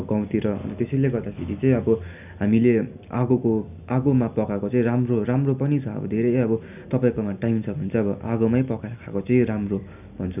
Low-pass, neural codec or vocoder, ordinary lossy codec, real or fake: 3.6 kHz; none; none; real